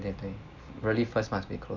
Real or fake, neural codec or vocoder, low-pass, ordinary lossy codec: real; none; 7.2 kHz; none